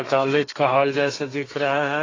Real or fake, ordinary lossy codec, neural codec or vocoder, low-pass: fake; AAC, 32 kbps; codec, 32 kHz, 1.9 kbps, SNAC; 7.2 kHz